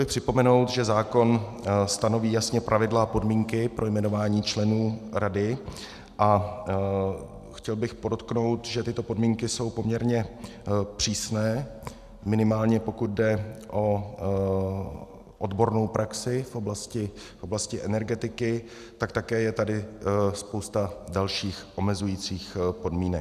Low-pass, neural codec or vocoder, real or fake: 14.4 kHz; none; real